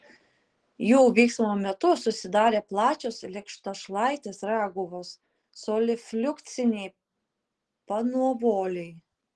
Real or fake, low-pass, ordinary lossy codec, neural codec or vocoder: real; 9.9 kHz; Opus, 16 kbps; none